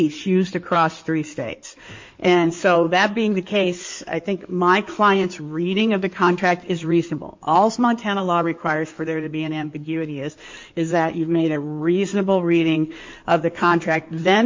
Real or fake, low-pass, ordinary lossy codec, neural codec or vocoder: fake; 7.2 kHz; MP3, 48 kbps; codec, 16 kHz in and 24 kHz out, 2.2 kbps, FireRedTTS-2 codec